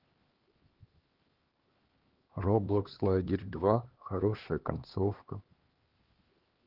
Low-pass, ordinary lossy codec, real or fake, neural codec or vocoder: 5.4 kHz; Opus, 16 kbps; fake; codec, 16 kHz, 2 kbps, X-Codec, HuBERT features, trained on LibriSpeech